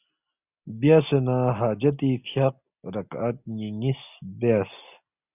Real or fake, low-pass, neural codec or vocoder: real; 3.6 kHz; none